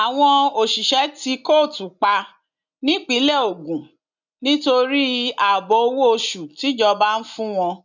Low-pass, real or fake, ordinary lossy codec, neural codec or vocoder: 7.2 kHz; real; none; none